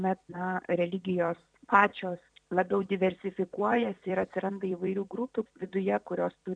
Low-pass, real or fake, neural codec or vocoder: 9.9 kHz; fake; vocoder, 44.1 kHz, 128 mel bands every 256 samples, BigVGAN v2